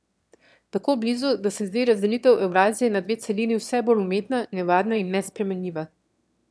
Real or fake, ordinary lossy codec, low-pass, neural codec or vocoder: fake; none; none; autoencoder, 22.05 kHz, a latent of 192 numbers a frame, VITS, trained on one speaker